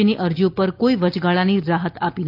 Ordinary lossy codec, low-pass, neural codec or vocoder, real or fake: Opus, 24 kbps; 5.4 kHz; none; real